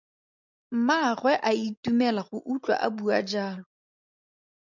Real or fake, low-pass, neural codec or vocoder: real; 7.2 kHz; none